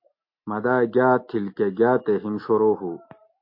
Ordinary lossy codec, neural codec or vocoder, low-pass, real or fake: MP3, 32 kbps; none; 5.4 kHz; real